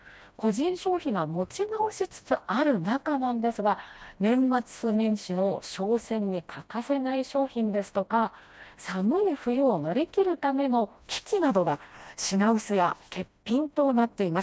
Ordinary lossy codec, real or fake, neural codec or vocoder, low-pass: none; fake; codec, 16 kHz, 1 kbps, FreqCodec, smaller model; none